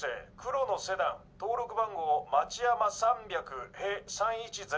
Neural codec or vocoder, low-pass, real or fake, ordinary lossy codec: none; none; real; none